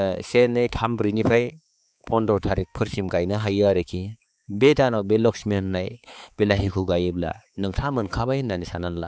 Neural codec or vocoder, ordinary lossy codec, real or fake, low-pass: codec, 16 kHz, 4 kbps, X-Codec, HuBERT features, trained on balanced general audio; none; fake; none